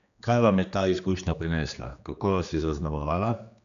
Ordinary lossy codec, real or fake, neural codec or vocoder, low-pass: AAC, 96 kbps; fake; codec, 16 kHz, 2 kbps, X-Codec, HuBERT features, trained on general audio; 7.2 kHz